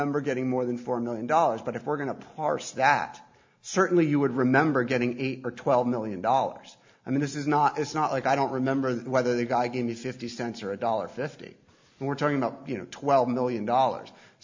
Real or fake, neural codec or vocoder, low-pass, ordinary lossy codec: real; none; 7.2 kHz; MP3, 64 kbps